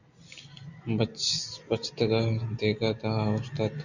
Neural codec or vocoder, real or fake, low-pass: none; real; 7.2 kHz